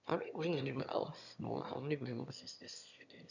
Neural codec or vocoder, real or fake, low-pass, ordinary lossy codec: autoencoder, 22.05 kHz, a latent of 192 numbers a frame, VITS, trained on one speaker; fake; 7.2 kHz; none